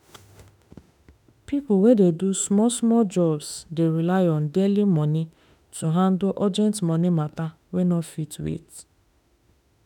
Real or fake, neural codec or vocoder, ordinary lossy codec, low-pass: fake; autoencoder, 48 kHz, 32 numbers a frame, DAC-VAE, trained on Japanese speech; none; 19.8 kHz